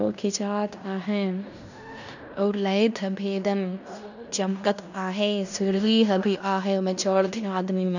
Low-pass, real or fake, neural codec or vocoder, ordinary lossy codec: 7.2 kHz; fake; codec, 16 kHz in and 24 kHz out, 0.9 kbps, LongCat-Audio-Codec, fine tuned four codebook decoder; none